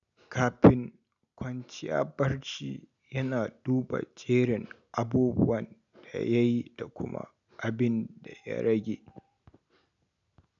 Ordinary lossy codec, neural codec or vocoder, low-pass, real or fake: none; none; 7.2 kHz; real